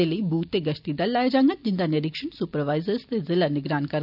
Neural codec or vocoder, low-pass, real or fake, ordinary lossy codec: none; 5.4 kHz; real; none